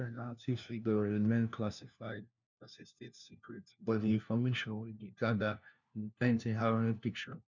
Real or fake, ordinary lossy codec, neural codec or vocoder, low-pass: fake; none; codec, 16 kHz, 1 kbps, FunCodec, trained on LibriTTS, 50 frames a second; 7.2 kHz